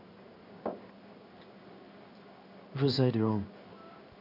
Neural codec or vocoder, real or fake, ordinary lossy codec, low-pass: codec, 16 kHz in and 24 kHz out, 1 kbps, XY-Tokenizer; fake; none; 5.4 kHz